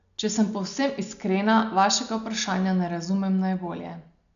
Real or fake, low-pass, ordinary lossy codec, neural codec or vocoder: real; 7.2 kHz; none; none